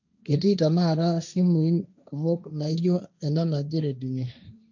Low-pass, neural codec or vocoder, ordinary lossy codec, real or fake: 7.2 kHz; codec, 16 kHz, 1.1 kbps, Voila-Tokenizer; none; fake